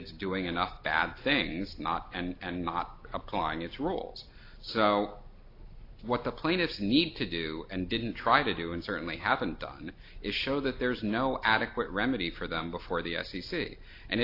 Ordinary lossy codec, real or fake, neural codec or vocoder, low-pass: AAC, 32 kbps; real; none; 5.4 kHz